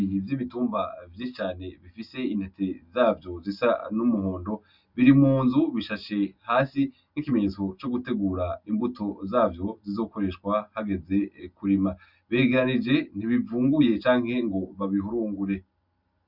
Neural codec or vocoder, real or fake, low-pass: none; real; 5.4 kHz